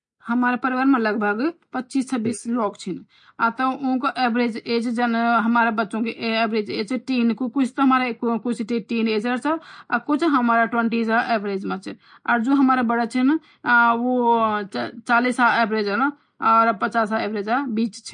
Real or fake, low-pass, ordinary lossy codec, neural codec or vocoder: real; 9.9 kHz; MP3, 48 kbps; none